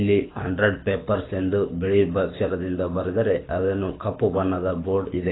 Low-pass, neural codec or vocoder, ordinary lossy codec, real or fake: 7.2 kHz; codec, 24 kHz, 6 kbps, HILCodec; AAC, 16 kbps; fake